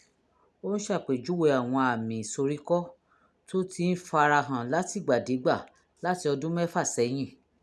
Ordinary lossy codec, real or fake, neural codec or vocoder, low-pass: none; real; none; none